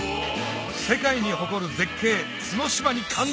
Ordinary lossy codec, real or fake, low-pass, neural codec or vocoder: none; real; none; none